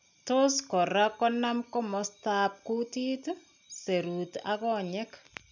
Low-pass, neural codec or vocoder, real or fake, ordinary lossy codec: 7.2 kHz; none; real; none